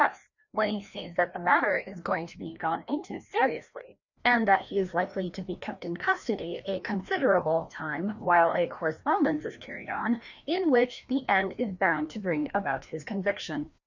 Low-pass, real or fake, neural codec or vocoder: 7.2 kHz; fake; codec, 16 kHz, 1 kbps, FreqCodec, larger model